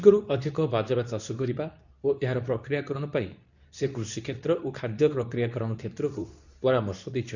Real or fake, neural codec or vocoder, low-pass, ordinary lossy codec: fake; codec, 24 kHz, 0.9 kbps, WavTokenizer, medium speech release version 2; 7.2 kHz; none